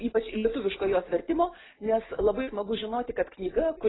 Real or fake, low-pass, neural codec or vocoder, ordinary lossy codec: real; 7.2 kHz; none; AAC, 16 kbps